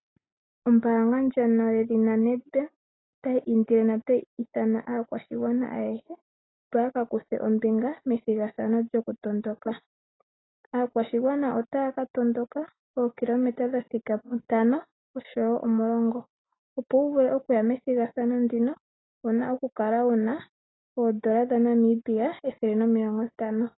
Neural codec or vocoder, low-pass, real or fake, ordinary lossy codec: none; 7.2 kHz; real; AAC, 16 kbps